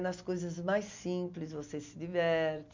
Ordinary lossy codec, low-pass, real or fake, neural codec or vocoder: MP3, 64 kbps; 7.2 kHz; real; none